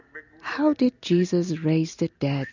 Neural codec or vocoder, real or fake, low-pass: none; real; 7.2 kHz